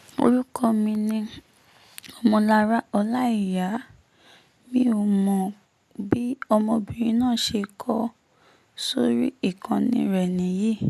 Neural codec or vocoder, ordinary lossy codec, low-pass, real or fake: none; none; 14.4 kHz; real